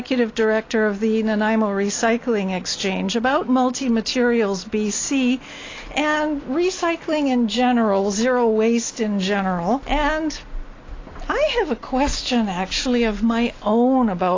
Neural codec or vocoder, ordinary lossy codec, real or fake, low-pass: none; AAC, 32 kbps; real; 7.2 kHz